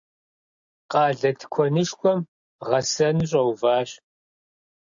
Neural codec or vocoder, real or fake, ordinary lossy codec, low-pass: none; real; AAC, 64 kbps; 7.2 kHz